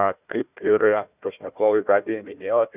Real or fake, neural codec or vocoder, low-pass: fake; codec, 16 kHz, 1 kbps, FunCodec, trained on Chinese and English, 50 frames a second; 3.6 kHz